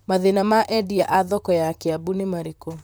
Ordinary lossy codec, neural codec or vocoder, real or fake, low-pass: none; vocoder, 44.1 kHz, 128 mel bands, Pupu-Vocoder; fake; none